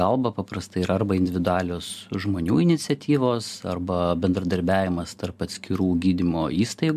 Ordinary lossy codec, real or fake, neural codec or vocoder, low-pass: MP3, 96 kbps; real; none; 14.4 kHz